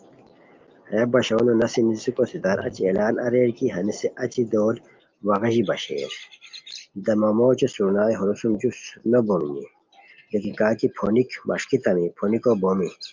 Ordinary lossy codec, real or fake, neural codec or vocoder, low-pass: Opus, 24 kbps; real; none; 7.2 kHz